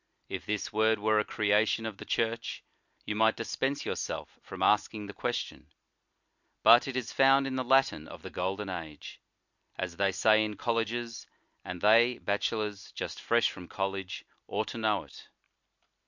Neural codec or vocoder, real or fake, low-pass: none; real; 7.2 kHz